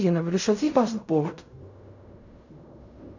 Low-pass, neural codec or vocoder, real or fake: 7.2 kHz; codec, 16 kHz in and 24 kHz out, 0.4 kbps, LongCat-Audio-Codec, fine tuned four codebook decoder; fake